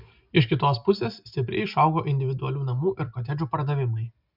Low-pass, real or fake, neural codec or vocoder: 5.4 kHz; real; none